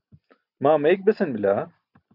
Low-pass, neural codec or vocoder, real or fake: 5.4 kHz; none; real